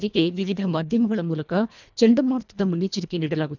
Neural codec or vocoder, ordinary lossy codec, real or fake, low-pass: codec, 24 kHz, 1.5 kbps, HILCodec; none; fake; 7.2 kHz